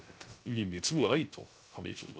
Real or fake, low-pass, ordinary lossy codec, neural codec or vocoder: fake; none; none; codec, 16 kHz, 0.3 kbps, FocalCodec